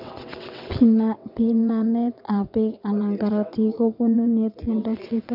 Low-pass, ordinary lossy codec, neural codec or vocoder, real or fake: 5.4 kHz; none; vocoder, 22.05 kHz, 80 mel bands, WaveNeXt; fake